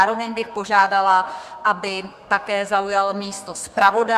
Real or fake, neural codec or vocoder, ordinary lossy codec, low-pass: fake; codec, 32 kHz, 1.9 kbps, SNAC; AAC, 96 kbps; 14.4 kHz